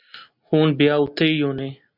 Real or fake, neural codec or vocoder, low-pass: real; none; 5.4 kHz